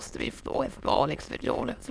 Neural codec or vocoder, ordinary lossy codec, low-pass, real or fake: autoencoder, 22.05 kHz, a latent of 192 numbers a frame, VITS, trained on many speakers; none; none; fake